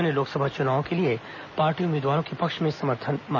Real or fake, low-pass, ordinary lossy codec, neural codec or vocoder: real; 7.2 kHz; none; none